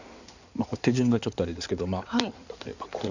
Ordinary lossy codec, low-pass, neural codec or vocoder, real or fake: none; 7.2 kHz; codec, 16 kHz in and 24 kHz out, 2.2 kbps, FireRedTTS-2 codec; fake